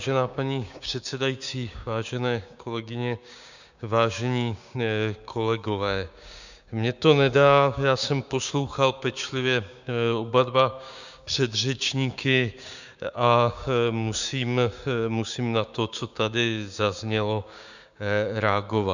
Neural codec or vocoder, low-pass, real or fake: codec, 16 kHz, 6 kbps, DAC; 7.2 kHz; fake